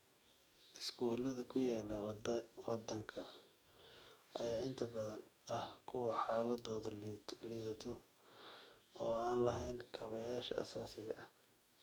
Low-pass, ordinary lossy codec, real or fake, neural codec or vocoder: none; none; fake; codec, 44.1 kHz, 2.6 kbps, DAC